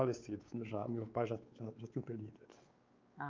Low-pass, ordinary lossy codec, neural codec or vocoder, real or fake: 7.2 kHz; Opus, 24 kbps; codec, 16 kHz, 4 kbps, X-Codec, WavLM features, trained on Multilingual LibriSpeech; fake